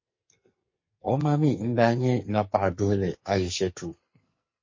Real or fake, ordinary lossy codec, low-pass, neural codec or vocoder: fake; MP3, 32 kbps; 7.2 kHz; codec, 44.1 kHz, 2.6 kbps, SNAC